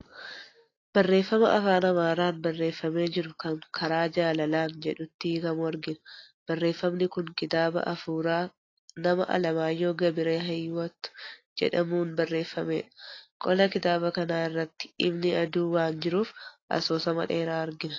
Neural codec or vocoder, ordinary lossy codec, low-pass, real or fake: none; AAC, 32 kbps; 7.2 kHz; real